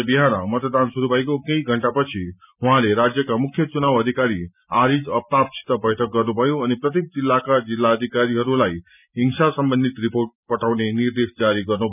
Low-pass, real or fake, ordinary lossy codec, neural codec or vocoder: 3.6 kHz; real; none; none